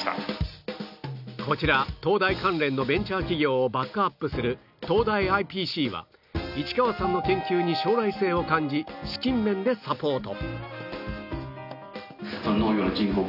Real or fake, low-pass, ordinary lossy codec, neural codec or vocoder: real; 5.4 kHz; none; none